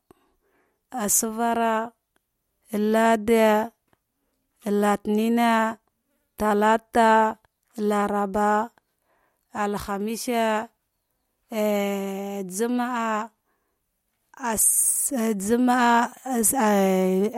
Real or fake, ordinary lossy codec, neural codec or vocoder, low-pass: real; MP3, 64 kbps; none; 19.8 kHz